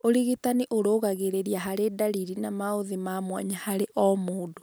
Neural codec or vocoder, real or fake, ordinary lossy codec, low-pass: none; real; none; none